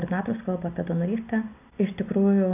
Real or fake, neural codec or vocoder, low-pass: real; none; 3.6 kHz